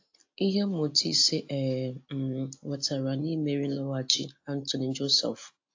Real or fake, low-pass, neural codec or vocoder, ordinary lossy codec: real; 7.2 kHz; none; AAC, 48 kbps